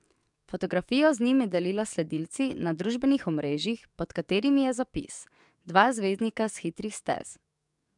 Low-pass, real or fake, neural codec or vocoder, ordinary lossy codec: 10.8 kHz; fake; codec, 44.1 kHz, 7.8 kbps, DAC; MP3, 96 kbps